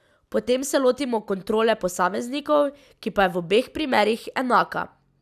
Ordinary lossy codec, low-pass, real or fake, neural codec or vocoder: none; 14.4 kHz; real; none